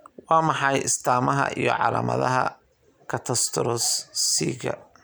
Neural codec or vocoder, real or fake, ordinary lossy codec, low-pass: none; real; none; none